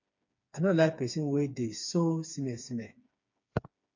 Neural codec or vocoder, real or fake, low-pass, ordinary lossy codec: codec, 16 kHz, 4 kbps, FreqCodec, smaller model; fake; 7.2 kHz; MP3, 48 kbps